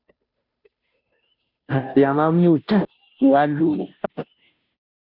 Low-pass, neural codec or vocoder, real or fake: 5.4 kHz; codec, 16 kHz, 0.5 kbps, FunCodec, trained on Chinese and English, 25 frames a second; fake